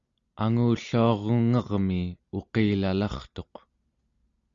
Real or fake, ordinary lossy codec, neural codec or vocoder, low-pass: real; Opus, 64 kbps; none; 7.2 kHz